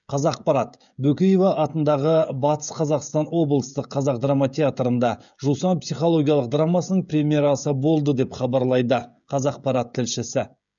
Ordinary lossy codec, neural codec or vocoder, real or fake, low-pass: none; codec, 16 kHz, 16 kbps, FreqCodec, smaller model; fake; 7.2 kHz